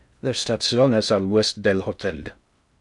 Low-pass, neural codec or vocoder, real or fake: 10.8 kHz; codec, 16 kHz in and 24 kHz out, 0.6 kbps, FocalCodec, streaming, 2048 codes; fake